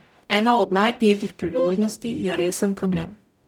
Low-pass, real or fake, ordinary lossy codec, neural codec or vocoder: 19.8 kHz; fake; none; codec, 44.1 kHz, 0.9 kbps, DAC